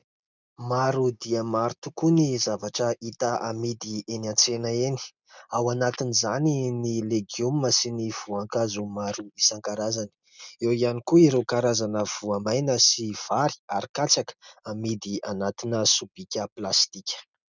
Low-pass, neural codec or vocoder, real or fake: 7.2 kHz; none; real